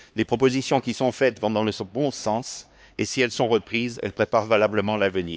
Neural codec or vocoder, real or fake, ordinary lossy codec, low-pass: codec, 16 kHz, 2 kbps, X-Codec, HuBERT features, trained on LibriSpeech; fake; none; none